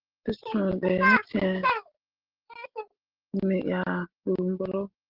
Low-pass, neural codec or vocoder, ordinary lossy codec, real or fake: 5.4 kHz; none; Opus, 16 kbps; real